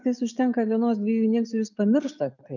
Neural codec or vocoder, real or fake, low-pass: none; real; 7.2 kHz